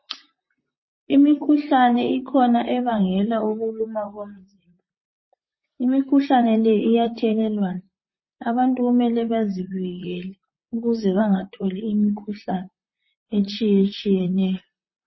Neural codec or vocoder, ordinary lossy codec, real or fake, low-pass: vocoder, 44.1 kHz, 128 mel bands, Pupu-Vocoder; MP3, 24 kbps; fake; 7.2 kHz